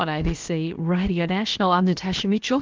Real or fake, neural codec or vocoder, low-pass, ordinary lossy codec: fake; codec, 16 kHz, about 1 kbps, DyCAST, with the encoder's durations; 7.2 kHz; Opus, 24 kbps